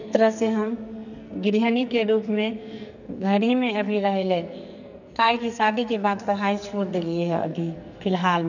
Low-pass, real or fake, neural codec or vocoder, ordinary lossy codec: 7.2 kHz; fake; codec, 44.1 kHz, 2.6 kbps, SNAC; none